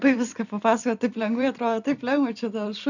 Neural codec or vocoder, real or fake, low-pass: vocoder, 44.1 kHz, 128 mel bands every 256 samples, BigVGAN v2; fake; 7.2 kHz